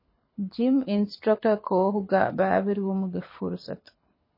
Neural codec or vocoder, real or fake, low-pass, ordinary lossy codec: codec, 24 kHz, 6 kbps, HILCodec; fake; 5.4 kHz; MP3, 24 kbps